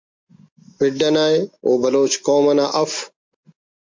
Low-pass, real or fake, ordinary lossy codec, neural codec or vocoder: 7.2 kHz; real; MP3, 48 kbps; none